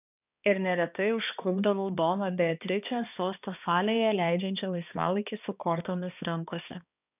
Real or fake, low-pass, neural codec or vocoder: fake; 3.6 kHz; codec, 16 kHz, 2 kbps, X-Codec, HuBERT features, trained on balanced general audio